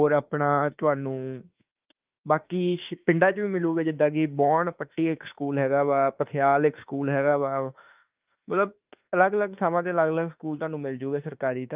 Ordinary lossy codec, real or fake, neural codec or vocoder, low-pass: Opus, 24 kbps; fake; autoencoder, 48 kHz, 32 numbers a frame, DAC-VAE, trained on Japanese speech; 3.6 kHz